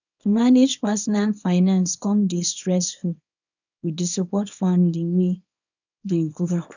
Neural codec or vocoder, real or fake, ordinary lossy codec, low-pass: codec, 24 kHz, 0.9 kbps, WavTokenizer, small release; fake; none; 7.2 kHz